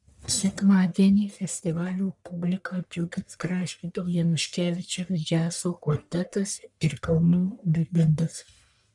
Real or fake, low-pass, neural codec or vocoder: fake; 10.8 kHz; codec, 44.1 kHz, 1.7 kbps, Pupu-Codec